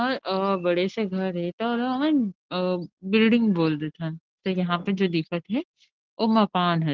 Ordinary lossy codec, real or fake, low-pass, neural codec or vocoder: Opus, 16 kbps; real; 7.2 kHz; none